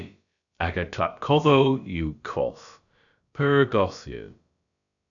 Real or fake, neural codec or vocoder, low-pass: fake; codec, 16 kHz, about 1 kbps, DyCAST, with the encoder's durations; 7.2 kHz